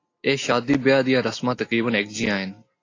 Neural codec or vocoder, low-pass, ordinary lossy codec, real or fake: none; 7.2 kHz; AAC, 32 kbps; real